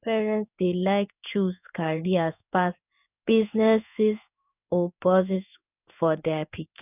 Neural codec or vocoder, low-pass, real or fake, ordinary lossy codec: codec, 16 kHz in and 24 kHz out, 1 kbps, XY-Tokenizer; 3.6 kHz; fake; none